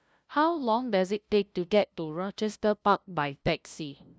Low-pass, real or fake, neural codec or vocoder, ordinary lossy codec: none; fake; codec, 16 kHz, 0.5 kbps, FunCodec, trained on LibriTTS, 25 frames a second; none